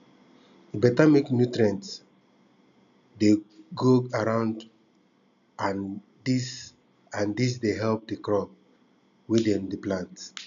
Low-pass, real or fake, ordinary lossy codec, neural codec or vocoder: 7.2 kHz; real; none; none